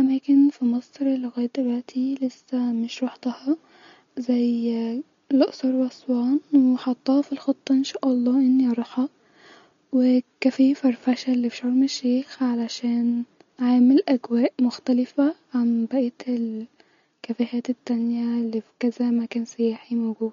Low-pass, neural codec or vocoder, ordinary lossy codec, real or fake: 7.2 kHz; none; MP3, 48 kbps; real